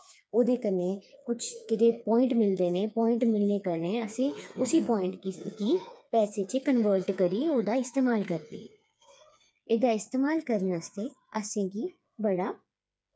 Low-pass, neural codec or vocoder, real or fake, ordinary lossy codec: none; codec, 16 kHz, 4 kbps, FreqCodec, smaller model; fake; none